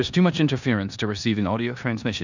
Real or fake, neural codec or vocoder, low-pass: fake; codec, 16 kHz in and 24 kHz out, 0.9 kbps, LongCat-Audio-Codec, four codebook decoder; 7.2 kHz